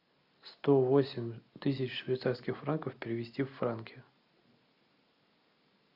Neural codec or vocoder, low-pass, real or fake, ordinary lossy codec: none; 5.4 kHz; real; AAC, 48 kbps